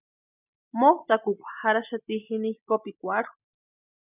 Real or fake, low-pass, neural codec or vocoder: real; 3.6 kHz; none